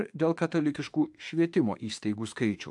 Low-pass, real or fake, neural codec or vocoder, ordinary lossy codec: 10.8 kHz; fake; autoencoder, 48 kHz, 32 numbers a frame, DAC-VAE, trained on Japanese speech; AAC, 64 kbps